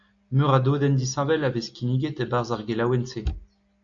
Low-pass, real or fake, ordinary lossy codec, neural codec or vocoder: 7.2 kHz; real; AAC, 64 kbps; none